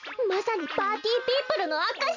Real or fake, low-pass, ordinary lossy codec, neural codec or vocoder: real; 7.2 kHz; none; none